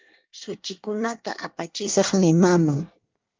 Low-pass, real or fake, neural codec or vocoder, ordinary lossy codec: 7.2 kHz; fake; codec, 24 kHz, 1 kbps, SNAC; Opus, 24 kbps